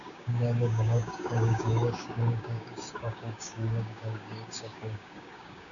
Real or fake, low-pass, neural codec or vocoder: fake; 7.2 kHz; codec, 16 kHz, 6 kbps, DAC